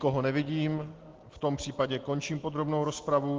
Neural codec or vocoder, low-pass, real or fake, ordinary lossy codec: none; 7.2 kHz; real; Opus, 16 kbps